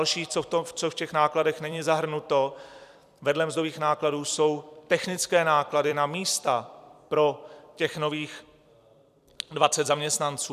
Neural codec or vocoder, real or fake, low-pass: vocoder, 44.1 kHz, 128 mel bands every 256 samples, BigVGAN v2; fake; 14.4 kHz